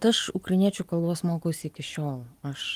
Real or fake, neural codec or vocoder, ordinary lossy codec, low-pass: real; none; Opus, 32 kbps; 14.4 kHz